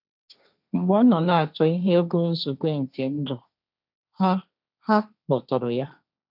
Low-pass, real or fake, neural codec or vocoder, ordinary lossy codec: 5.4 kHz; fake; codec, 16 kHz, 1.1 kbps, Voila-Tokenizer; none